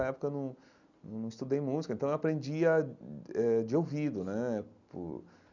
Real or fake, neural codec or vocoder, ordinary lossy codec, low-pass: real; none; none; 7.2 kHz